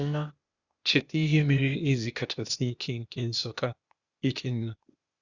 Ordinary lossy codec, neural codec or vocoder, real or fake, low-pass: Opus, 64 kbps; codec, 16 kHz, 0.8 kbps, ZipCodec; fake; 7.2 kHz